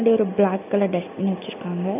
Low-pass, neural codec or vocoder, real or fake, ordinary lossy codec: 3.6 kHz; none; real; none